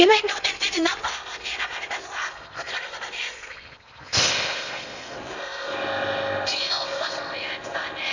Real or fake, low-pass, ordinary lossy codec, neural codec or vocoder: fake; 7.2 kHz; none; codec, 16 kHz in and 24 kHz out, 0.8 kbps, FocalCodec, streaming, 65536 codes